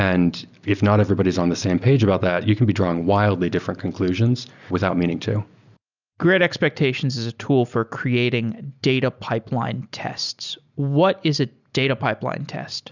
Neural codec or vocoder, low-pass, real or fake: none; 7.2 kHz; real